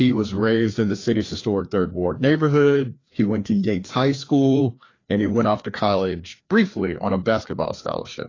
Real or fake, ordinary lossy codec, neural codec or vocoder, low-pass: fake; AAC, 32 kbps; codec, 16 kHz, 2 kbps, FreqCodec, larger model; 7.2 kHz